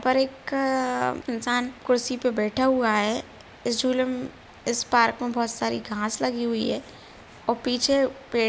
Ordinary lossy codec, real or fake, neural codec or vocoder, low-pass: none; real; none; none